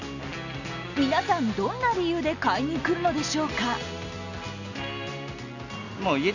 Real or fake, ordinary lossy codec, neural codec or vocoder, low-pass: real; none; none; 7.2 kHz